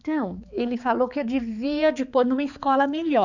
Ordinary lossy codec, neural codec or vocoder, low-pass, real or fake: none; codec, 16 kHz, 4 kbps, X-Codec, HuBERT features, trained on balanced general audio; 7.2 kHz; fake